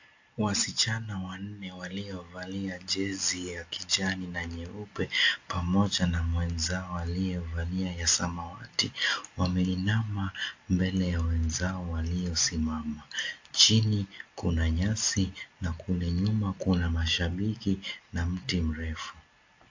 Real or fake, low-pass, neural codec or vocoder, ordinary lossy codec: real; 7.2 kHz; none; AAC, 48 kbps